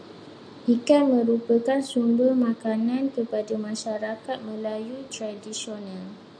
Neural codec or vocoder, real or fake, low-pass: none; real; 9.9 kHz